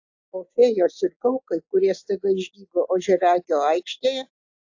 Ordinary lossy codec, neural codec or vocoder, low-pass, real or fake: AAC, 48 kbps; none; 7.2 kHz; real